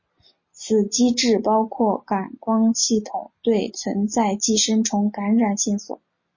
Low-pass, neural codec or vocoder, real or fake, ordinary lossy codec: 7.2 kHz; none; real; MP3, 32 kbps